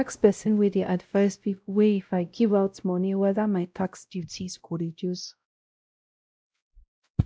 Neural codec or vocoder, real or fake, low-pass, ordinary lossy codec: codec, 16 kHz, 0.5 kbps, X-Codec, WavLM features, trained on Multilingual LibriSpeech; fake; none; none